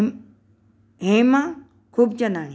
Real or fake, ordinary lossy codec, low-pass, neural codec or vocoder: real; none; none; none